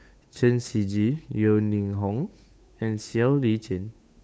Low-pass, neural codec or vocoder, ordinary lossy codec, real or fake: none; codec, 16 kHz, 8 kbps, FunCodec, trained on Chinese and English, 25 frames a second; none; fake